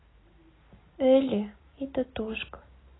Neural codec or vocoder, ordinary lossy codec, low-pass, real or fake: none; AAC, 16 kbps; 7.2 kHz; real